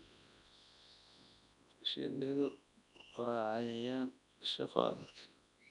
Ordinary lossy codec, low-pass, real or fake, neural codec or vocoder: none; 10.8 kHz; fake; codec, 24 kHz, 0.9 kbps, WavTokenizer, large speech release